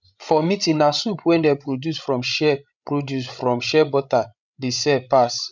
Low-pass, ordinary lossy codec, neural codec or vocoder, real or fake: 7.2 kHz; none; codec, 16 kHz, 16 kbps, FreqCodec, larger model; fake